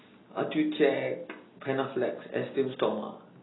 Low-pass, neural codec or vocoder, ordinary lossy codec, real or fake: 7.2 kHz; none; AAC, 16 kbps; real